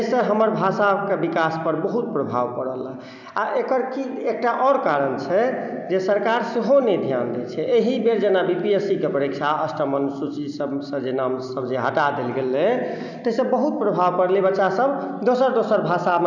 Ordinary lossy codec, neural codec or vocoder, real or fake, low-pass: none; none; real; 7.2 kHz